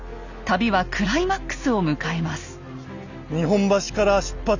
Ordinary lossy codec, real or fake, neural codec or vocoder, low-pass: none; real; none; 7.2 kHz